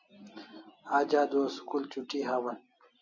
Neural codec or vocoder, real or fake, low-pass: none; real; 7.2 kHz